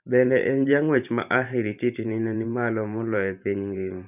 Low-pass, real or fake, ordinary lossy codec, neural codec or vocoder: 3.6 kHz; fake; none; vocoder, 44.1 kHz, 128 mel bands every 512 samples, BigVGAN v2